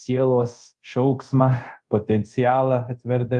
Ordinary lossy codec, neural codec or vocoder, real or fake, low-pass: Opus, 32 kbps; codec, 24 kHz, 0.5 kbps, DualCodec; fake; 10.8 kHz